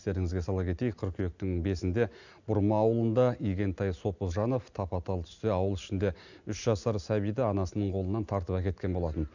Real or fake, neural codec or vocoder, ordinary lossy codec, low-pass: real; none; none; 7.2 kHz